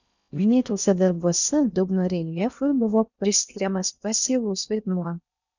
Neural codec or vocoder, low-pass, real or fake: codec, 16 kHz in and 24 kHz out, 0.6 kbps, FocalCodec, streaming, 4096 codes; 7.2 kHz; fake